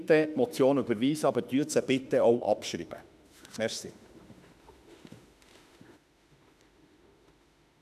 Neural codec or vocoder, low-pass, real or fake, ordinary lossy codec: autoencoder, 48 kHz, 32 numbers a frame, DAC-VAE, trained on Japanese speech; 14.4 kHz; fake; none